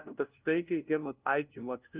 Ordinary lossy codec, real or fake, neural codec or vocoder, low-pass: Opus, 24 kbps; fake; codec, 16 kHz, 0.5 kbps, FunCodec, trained on LibriTTS, 25 frames a second; 3.6 kHz